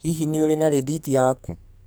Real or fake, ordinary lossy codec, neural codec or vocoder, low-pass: fake; none; codec, 44.1 kHz, 2.6 kbps, SNAC; none